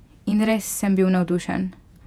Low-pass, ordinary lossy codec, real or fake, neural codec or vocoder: 19.8 kHz; none; fake; vocoder, 48 kHz, 128 mel bands, Vocos